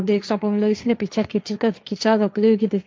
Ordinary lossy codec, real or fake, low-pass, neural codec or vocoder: none; fake; none; codec, 16 kHz, 1.1 kbps, Voila-Tokenizer